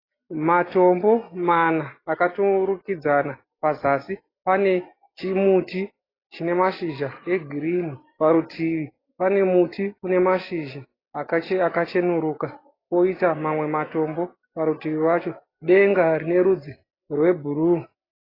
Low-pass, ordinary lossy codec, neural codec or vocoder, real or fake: 5.4 kHz; AAC, 24 kbps; none; real